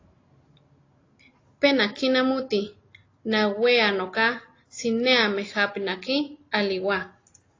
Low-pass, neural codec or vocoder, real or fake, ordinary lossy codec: 7.2 kHz; none; real; AAC, 32 kbps